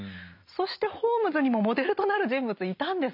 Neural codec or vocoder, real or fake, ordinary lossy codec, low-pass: none; real; none; 5.4 kHz